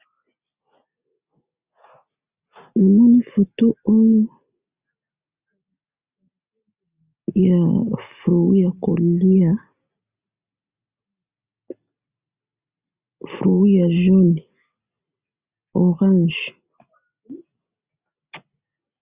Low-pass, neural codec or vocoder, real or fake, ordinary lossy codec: 3.6 kHz; none; real; Opus, 64 kbps